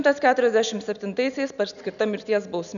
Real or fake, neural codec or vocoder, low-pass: real; none; 7.2 kHz